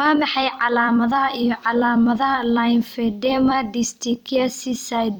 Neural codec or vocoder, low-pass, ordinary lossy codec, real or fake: vocoder, 44.1 kHz, 128 mel bands every 256 samples, BigVGAN v2; none; none; fake